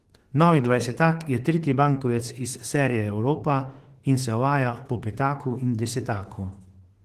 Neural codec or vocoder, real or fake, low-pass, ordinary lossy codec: autoencoder, 48 kHz, 32 numbers a frame, DAC-VAE, trained on Japanese speech; fake; 14.4 kHz; Opus, 16 kbps